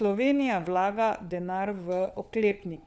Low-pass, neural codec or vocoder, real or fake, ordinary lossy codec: none; codec, 16 kHz, 4 kbps, FunCodec, trained on LibriTTS, 50 frames a second; fake; none